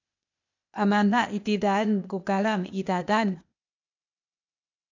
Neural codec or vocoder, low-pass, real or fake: codec, 16 kHz, 0.8 kbps, ZipCodec; 7.2 kHz; fake